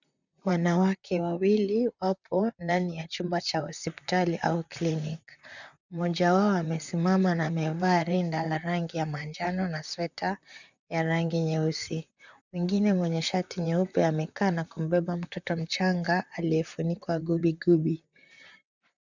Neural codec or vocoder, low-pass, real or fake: vocoder, 44.1 kHz, 128 mel bands, Pupu-Vocoder; 7.2 kHz; fake